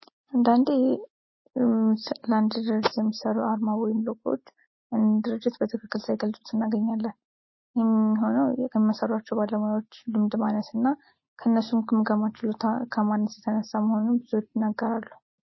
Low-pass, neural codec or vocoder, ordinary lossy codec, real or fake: 7.2 kHz; none; MP3, 24 kbps; real